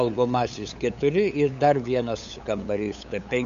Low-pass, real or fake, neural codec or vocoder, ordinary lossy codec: 7.2 kHz; fake; codec, 16 kHz, 8 kbps, FunCodec, trained on LibriTTS, 25 frames a second; MP3, 96 kbps